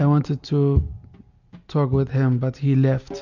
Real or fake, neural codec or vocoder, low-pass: real; none; 7.2 kHz